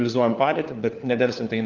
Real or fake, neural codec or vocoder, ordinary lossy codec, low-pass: fake; codec, 16 kHz, 4 kbps, FunCodec, trained on LibriTTS, 50 frames a second; Opus, 32 kbps; 7.2 kHz